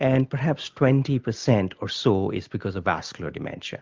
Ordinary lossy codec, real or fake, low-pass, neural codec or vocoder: Opus, 32 kbps; real; 7.2 kHz; none